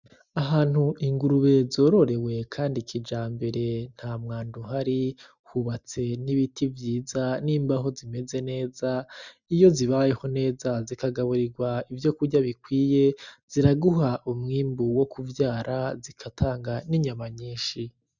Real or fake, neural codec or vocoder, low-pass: real; none; 7.2 kHz